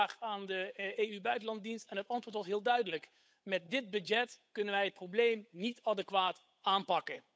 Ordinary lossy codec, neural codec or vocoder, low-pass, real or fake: none; codec, 16 kHz, 8 kbps, FunCodec, trained on Chinese and English, 25 frames a second; none; fake